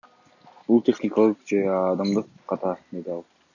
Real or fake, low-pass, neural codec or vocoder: real; 7.2 kHz; none